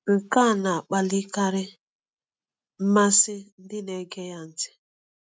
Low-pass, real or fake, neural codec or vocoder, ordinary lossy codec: none; real; none; none